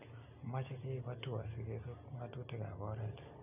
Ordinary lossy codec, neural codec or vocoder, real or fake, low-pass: none; none; real; 3.6 kHz